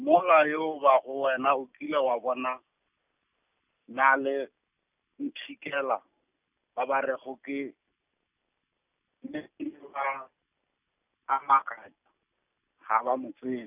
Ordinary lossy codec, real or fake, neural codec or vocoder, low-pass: none; real; none; 3.6 kHz